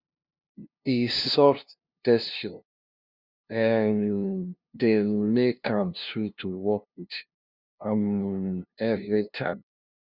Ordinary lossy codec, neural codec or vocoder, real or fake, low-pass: Opus, 64 kbps; codec, 16 kHz, 0.5 kbps, FunCodec, trained on LibriTTS, 25 frames a second; fake; 5.4 kHz